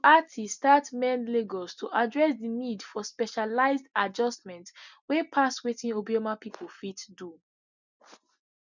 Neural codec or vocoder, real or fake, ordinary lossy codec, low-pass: none; real; none; 7.2 kHz